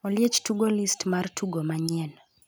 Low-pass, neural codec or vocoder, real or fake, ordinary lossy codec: none; none; real; none